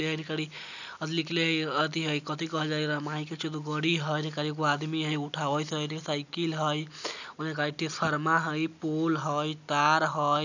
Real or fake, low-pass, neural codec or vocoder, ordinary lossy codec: real; 7.2 kHz; none; none